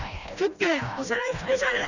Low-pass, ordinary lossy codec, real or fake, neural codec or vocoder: 7.2 kHz; none; fake; codec, 16 kHz, 1 kbps, FreqCodec, smaller model